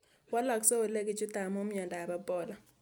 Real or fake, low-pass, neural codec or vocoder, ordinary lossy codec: real; none; none; none